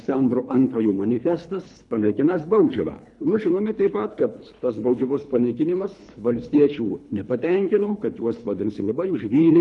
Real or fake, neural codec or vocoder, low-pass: fake; codec, 24 kHz, 3 kbps, HILCodec; 10.8 kHz